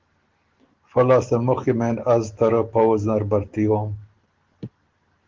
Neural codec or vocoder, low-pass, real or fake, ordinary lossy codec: none; 7.2 kHz; real; Opus, 16 kbps